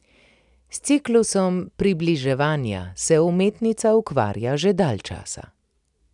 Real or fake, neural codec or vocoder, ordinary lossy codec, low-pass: real; none; none; 10.8 kHz